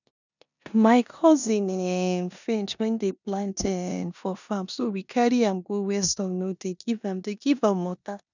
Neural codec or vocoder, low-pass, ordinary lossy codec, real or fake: codec, 16 kHz in and 24 kHz out, 0.9 kbps, LongCat-Audio-Codec, four codebook decoder; 7.2 kHz; none; fake